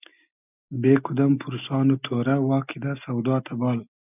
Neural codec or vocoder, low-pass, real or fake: none; 3.6 kHz; real